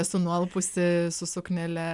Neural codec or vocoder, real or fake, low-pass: none; real; 10.8 kHz